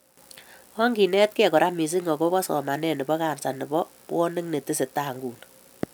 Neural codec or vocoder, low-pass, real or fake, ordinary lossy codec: none; none; real; none